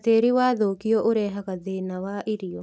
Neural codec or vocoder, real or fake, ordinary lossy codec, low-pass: none; real; none; none